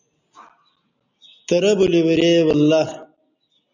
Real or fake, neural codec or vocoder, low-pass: real; none; 7.2 kHz